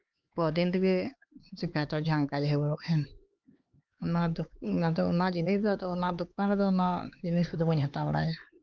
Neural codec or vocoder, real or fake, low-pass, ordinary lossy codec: codec, 16 kHz, 4 kbps, X-Codec, HuBERT features, trained on LibriSpeech; fake; 7.2 kHz; Opus, 24 kbps